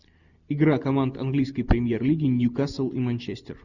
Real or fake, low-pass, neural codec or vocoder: real; 7.2 kHz; none